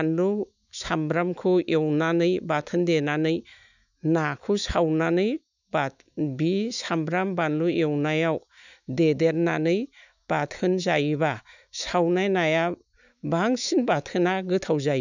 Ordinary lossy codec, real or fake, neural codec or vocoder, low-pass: none; fake; autoencoder, 48 kHz, 128 numbers a frame, DAC-VAE, trained on Japanese speech; 7.2 kHz